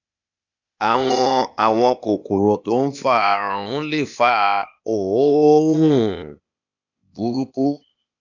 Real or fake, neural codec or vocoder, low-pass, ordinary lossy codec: fake; codec, 16 kHz, 0.8 kbps, ZipCodec; 7.2 kHz; none